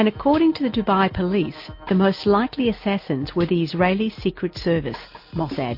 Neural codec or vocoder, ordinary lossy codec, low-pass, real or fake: none; MP3, 32 kbps; 5.4 kHz; real